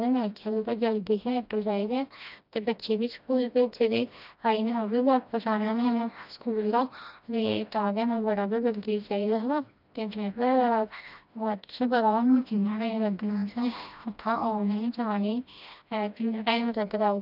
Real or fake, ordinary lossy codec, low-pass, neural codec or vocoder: fake; none; 5.4 kHz; codec, 16 kHz, 1 kbps, FreqCodec, smaller model